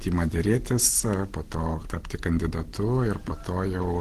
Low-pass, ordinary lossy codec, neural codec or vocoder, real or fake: 14.4 kHz; Opus, 16 kbps; none; real